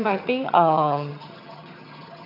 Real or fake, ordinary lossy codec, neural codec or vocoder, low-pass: fake; none; vocoder, 22.05 kHz, 80 mel bands, HiFi-GAN; 5.4 kHz